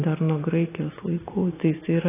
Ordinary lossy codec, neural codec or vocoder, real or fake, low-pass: AAC, 16 kbps; none; real; 3.6 kHz